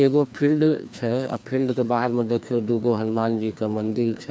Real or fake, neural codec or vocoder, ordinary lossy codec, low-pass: fake; codec, 16 kHz, 2 kbps, FreqCodec, larger model; none; none